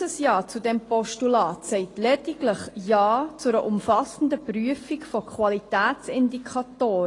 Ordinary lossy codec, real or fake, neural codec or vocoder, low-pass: AAC, 32 kbps; real; none; 10.8 kHz